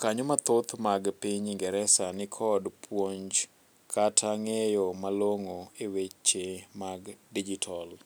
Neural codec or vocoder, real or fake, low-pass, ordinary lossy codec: none; real; none; none